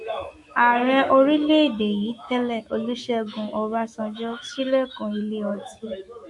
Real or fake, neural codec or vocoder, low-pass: fake; autoencoder, 48 kHz, 128 numbers a frame, DAC-VAE, trained on Japanese speech; 10.8 kHz